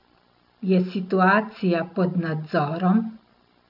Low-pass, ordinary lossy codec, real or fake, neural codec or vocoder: 5.4 kHz; none; real; none